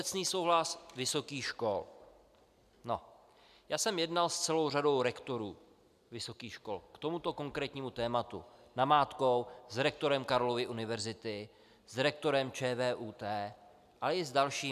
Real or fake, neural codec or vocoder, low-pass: real; none; 14.4 kHz